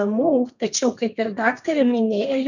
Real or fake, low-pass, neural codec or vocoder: fake; 7.2 kHz; codec, 32 kHz, 1.9 kbps, SNAC